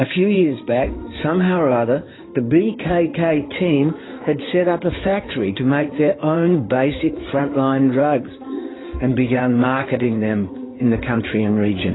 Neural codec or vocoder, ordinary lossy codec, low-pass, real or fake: codec, 16 kHz in and 24 kHz out, 2.2 kbps, FireRedTTS-2 codec; AAC, 16 kbps; 7.2 kHz; fake